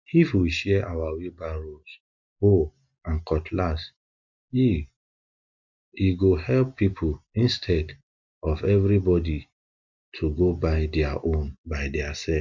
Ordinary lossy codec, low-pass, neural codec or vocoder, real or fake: MP3, 64 kbps; 7.2 kHz; none; real